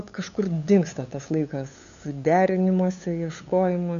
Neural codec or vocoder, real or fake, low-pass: codec, 16 kHz, 8 kbps, FunCodec, trained on LibriTTS, 25 frames a second; fake; 7.2 kHz